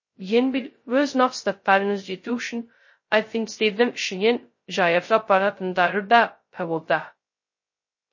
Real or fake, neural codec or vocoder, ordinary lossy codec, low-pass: fake; codec, 16 kHz, 0.2 kbps, FocalCodec; MP3, 32 kbps; 7.2 kHz